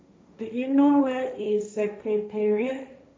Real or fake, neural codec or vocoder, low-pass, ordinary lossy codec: fake; codec, 16 kHz, 1.1 kbps, Voila-Tokenizer; none; none